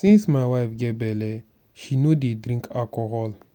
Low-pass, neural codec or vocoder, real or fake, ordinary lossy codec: 19.8 kHz; none; real; none